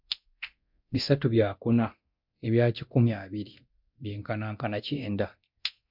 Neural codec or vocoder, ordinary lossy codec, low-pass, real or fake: codec, 24 kHz, 0.9 kbps, DualCodec; MP3, 48 kbps; 5.4 kHz; fake